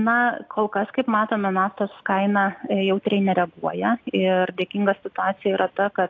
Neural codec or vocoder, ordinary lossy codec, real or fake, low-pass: none; AAC, 48 kbps; real; 7.2 kHz